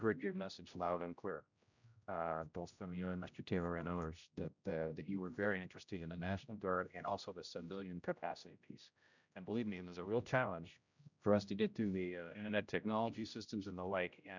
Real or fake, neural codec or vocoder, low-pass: fake; codec, 16 kHz, 0.5 kbps, X-Codec, HuBERT features, trained on general audio; 7.2 kHz